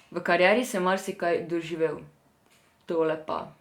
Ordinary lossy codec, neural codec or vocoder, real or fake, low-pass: Opus, 64 kbps; none; real; 19.8 kHz